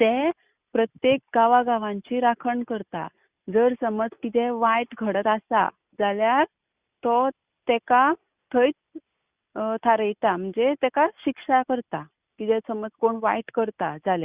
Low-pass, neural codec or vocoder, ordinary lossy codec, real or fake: 3.6 kHz; none; Opus, 64 kbps; real